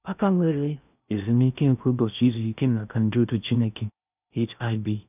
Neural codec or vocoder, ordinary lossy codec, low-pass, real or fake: codec, 16 kHz in and 24 kHz out, 0.6 kbps, FocalCodec, streaming, 2048 codes; none; 3.6 kHz; fake